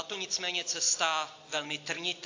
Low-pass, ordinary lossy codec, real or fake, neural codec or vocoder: 7.2 kHz; AAC, 48 kbps; real; none